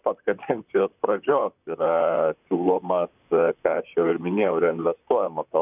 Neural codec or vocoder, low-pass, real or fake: vocoder, 44.1 kHz, 128 mel bands, Pupu-Vocoder; 3.6 kHz; fake